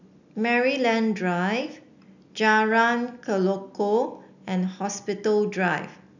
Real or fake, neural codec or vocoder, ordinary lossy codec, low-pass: real; none; none; 7.2 kHz